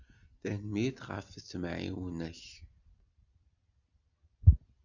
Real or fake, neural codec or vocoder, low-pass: real; none; 7.2 kHz